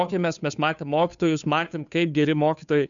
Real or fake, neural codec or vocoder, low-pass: fake; codec, 16 kHz, 2 kbps, FunCodec, trained on Chinese and English, 25 frames a second; 7.2 kHz